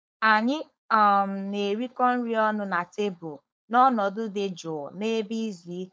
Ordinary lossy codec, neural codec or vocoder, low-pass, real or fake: none; codec, 16 kHz, 4.8 kbps, FACodec; none; fake